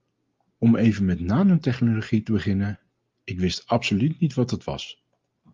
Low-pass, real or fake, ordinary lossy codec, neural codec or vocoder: 7.2 kHz; real; Opus, 32 kbps; none